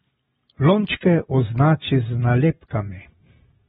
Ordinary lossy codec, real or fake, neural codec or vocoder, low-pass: AAC, 16 kbps; real; none; 19.8 kHz